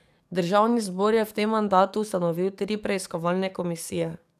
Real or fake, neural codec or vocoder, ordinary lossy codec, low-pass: fake; codec, 44.1 kHz, 7.8 kbps, DAC; none; 14.4 kHz